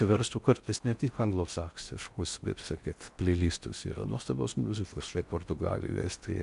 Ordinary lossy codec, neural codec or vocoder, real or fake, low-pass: MP3, 96 kbps; codec, 16 kHz in and 24 kHz out, 0.6 kbps, FocalCodec, streaming, 4096 codes; fake; 10.8 kHz